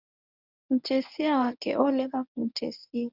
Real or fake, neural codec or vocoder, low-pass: fake; codec, 24 kHz, 0.9 kbps, WavTokenizer, medium speech release version 1; 5.4 kHz